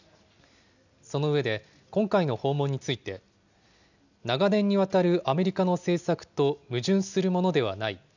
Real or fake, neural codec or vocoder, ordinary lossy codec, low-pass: real; none; none; 7.2 kHz